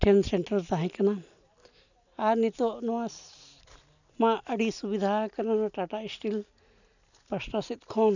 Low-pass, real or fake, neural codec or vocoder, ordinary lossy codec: 7.2 kHz; real; none; none